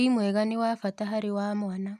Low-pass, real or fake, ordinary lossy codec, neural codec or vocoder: 14.4 kHz; real; none; none